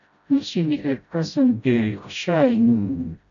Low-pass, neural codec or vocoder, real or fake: 7.2 kHz; codec, 16 kHz, 0.5 kbps, FreqCodec, smaller model; fake